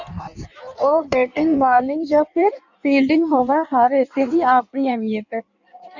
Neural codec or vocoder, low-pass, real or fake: codec, 16 kHz in and 24 kHz out, 1.1 kbps, FireRedTTS-2 codec; 7.2 kHz; fake